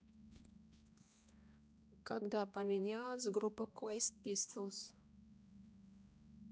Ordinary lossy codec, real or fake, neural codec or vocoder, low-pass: none; fake; codec, 16 kHz, 1 kbps, X-Codec, HuBERT features, trained on balanced general audio; none